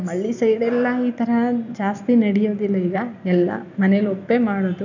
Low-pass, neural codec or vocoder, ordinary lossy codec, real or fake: 7.2 kHz; none; none; real